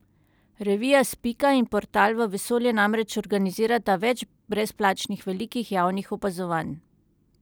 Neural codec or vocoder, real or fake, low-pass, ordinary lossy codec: none; real; none; none